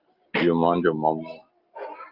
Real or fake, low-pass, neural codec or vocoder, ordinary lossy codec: real; 5.4 kHz; none; Opus, 24 kbps